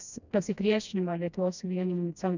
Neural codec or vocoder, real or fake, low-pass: codec, 16 kHz, 1 kbps, FreqCodec, smaller model; fake; 7.2 kHz